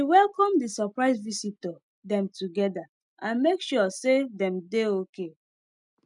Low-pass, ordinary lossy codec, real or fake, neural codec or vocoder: 10.8 kHz; none; real; none